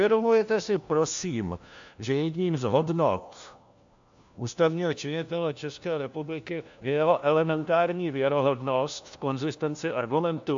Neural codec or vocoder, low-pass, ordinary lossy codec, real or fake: codec, 16 kHz, 1 kbps, FunCodec, trained on LibriTTS, 50 frames a second; 7.2 kHz; AAC, 64 kbps; fake